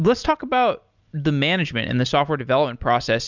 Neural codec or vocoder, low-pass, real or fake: none; 7.2 kHz; real